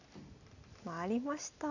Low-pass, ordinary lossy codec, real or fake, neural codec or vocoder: 7.2 kHz; none; real; none